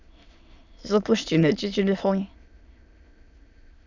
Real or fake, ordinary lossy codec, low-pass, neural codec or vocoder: fake; none; 7.2 kHz; autoencoder, 22.05 kHz, a latent of 192 numbers a frame, VITS, trained on many speakers